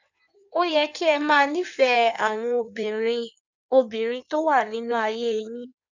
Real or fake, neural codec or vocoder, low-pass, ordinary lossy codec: fake; codec, 16 kHz in and 24 kHz out, 1.1 kbps, FireRedTTS-2 codec; 7.2 kHz; none